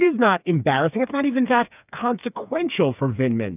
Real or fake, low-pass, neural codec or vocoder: fake; 3.6 kHz; codec, 16 kHz, 4 kbps, FreqCodec, smaller model